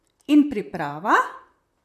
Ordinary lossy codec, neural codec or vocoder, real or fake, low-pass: none; vocoder, 44.1 kHz, 128 mel bands, Pupu-Vocoder; fake; 14.4 kHz